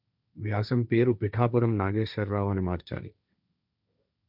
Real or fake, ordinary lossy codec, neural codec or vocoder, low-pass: fake; none; codec, 16 kHz, 1.1 kbps, Voila-Tokenizer; 5.4 kHz